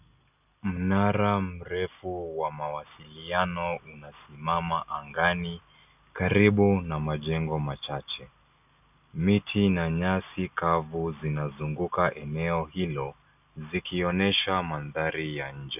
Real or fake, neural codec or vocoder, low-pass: real; none; 3.6 kHz